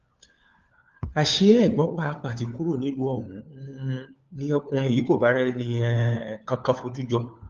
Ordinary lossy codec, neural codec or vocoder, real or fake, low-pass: Opus, 32 kbps; codec, 16 kHz, 4 kbps, FunCodec, trained on LibriTTS, 50 frames a second; fake; 7.2 kHz